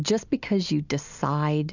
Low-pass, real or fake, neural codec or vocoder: 7.2 kHz; real; none